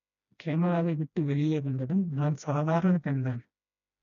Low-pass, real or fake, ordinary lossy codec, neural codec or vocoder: 7.2 kHz; fake; none; codec, 16 kHz, 1 kbps, FreqCodec, smaller model